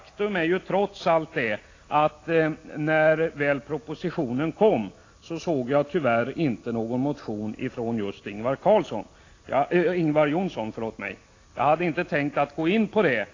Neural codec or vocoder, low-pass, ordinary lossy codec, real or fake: none; 7.2 kHz; AAC, 32 kbps; real